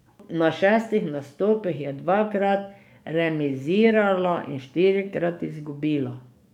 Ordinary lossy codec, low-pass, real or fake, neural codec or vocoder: none; 19.8 kHz; fake; codec, 44.1 kHz, 7.8 kbps, DAC